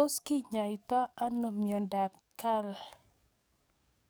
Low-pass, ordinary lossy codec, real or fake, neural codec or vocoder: none; none; fake; codec, 44.1 kHz, 7.8 kbps, DAC